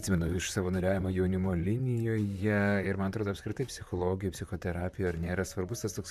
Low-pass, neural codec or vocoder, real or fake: 14.4 kHz; vocoder, 44.1 kHz, 128 mel bands, Pupu-Vocoder; fake